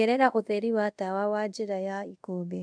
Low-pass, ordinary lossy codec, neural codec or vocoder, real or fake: 9.9 kHz; none; codec, 24 kHz, 0.5 kbps, DualCodec; fake